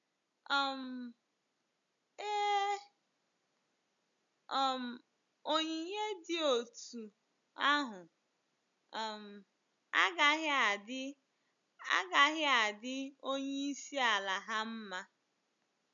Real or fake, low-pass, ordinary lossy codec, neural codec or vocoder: real; 7.2 kHz; none; none